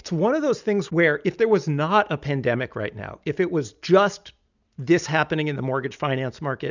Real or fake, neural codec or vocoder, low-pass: real; none; 7.2 kHz